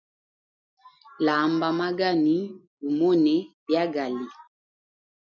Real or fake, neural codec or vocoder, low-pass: real; none; 7.2 kHz